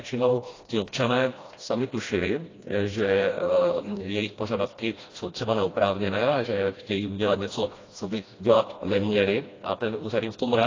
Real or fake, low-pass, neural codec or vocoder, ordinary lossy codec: fake; 7.2 kHz; codec, 16 kHz, 1 kbps, FreqCodec, smaller model; AAC, 32 kbps